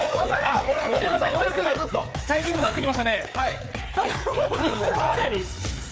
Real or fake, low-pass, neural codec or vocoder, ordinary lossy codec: fake; none; codec, 16 kHz, 4 kbps, FreqCodec, larger model; none